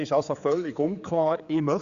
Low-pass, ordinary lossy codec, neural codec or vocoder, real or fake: 7.2 kHz; Opus, 64 kbps; codec, 16 kHz, 4 kbps, X-Codec, HuBERT features, trained on general audio; fake